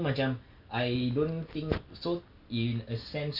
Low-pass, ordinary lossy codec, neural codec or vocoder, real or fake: 5.4 kHz; none; none; real